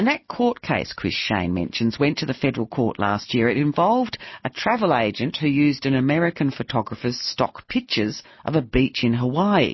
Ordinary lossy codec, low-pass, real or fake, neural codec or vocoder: MP3, 24 kbps; 7.2 kHz; real; none